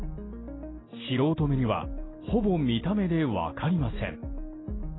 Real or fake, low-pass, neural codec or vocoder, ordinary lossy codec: real; 7.2 kHz; none; AAC, 16 kbps